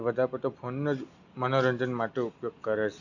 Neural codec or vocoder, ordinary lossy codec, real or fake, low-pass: none; none; real; 7.2 kHz